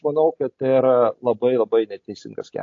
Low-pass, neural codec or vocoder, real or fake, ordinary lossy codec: 7.2 kHz; none; real; AAC, 48 kbps